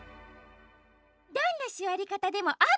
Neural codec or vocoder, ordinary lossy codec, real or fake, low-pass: none; none; real; none